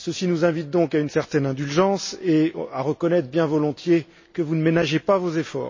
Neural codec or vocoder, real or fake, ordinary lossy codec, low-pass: none; real; MP3, 32 kbps; 7.2 kHz